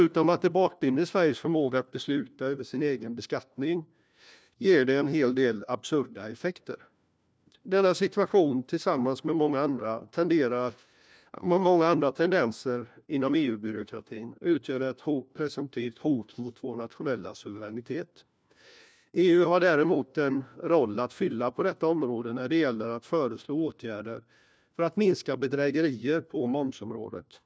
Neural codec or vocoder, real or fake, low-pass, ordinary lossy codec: codec, 16 kHz, 1 kbps, FunCodec, trained on LibriTTS, 50 frames a second; fake; none; none